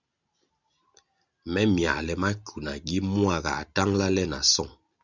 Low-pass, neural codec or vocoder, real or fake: 7.2 kHz; none; real